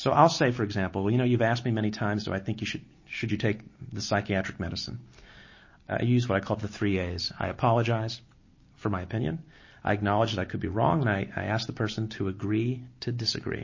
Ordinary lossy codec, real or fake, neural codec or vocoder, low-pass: MP3, 32 kbps; real; none; 7.2 kHz